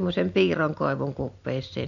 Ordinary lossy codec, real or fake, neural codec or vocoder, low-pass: none; real; none; 7.2 kHz